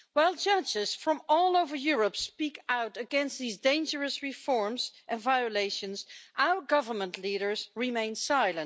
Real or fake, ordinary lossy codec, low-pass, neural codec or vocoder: real; none; none; none